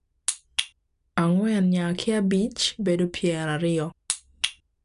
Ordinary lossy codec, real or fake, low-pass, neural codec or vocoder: none; real; 10.8 kHz; none